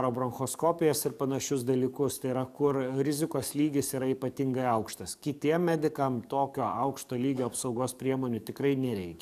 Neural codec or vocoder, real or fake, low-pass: codec, 44.1 kHz, 7.8 kbps, DAC; fake; 14.4 kHz